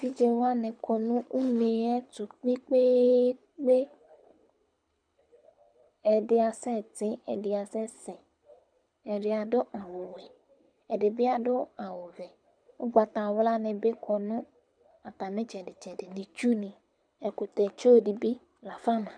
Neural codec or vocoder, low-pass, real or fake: codec, 24 kHz, 6 kbps, HILCodec; 9.9 kHz; fake